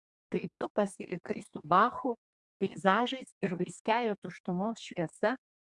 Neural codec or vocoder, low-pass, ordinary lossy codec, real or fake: codec, 32 kHz, 1.9 kbps, SNAC; 10.8 kHz; Opus, 64 kbps; fake